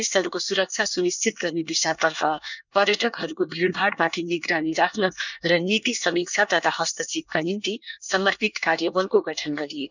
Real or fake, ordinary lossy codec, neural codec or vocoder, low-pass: fake; none; codec, 24 kHz, 1 kbps, SNAC; 7.2 kHz